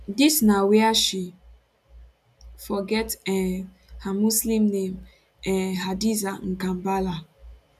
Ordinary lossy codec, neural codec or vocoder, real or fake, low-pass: none; none; real; 14.4 kHz